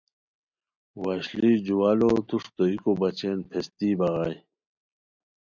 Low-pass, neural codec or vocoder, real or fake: 7.2 kHz; none; real